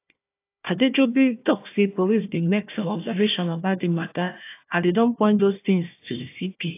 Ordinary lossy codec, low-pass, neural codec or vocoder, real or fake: AAC, 24 kbps; 3.6 kHz; codec, 16 kHz, 1 kbps, FunCodec, trained on Chinese and English, 50 frames a second; fake